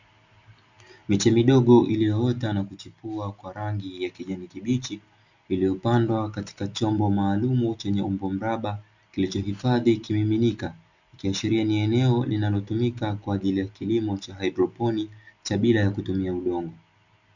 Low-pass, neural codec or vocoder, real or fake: 7.2 kHz; none; real